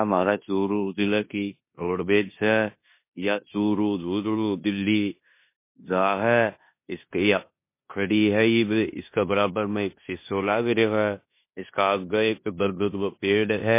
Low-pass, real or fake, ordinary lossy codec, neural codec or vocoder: 3.6 kHz; fake; MP3, 24 kbps; codec, 16 kHz in and 24 kHz out, 0.9 kbps, LongCat-Audio-Codec, four codebook decoder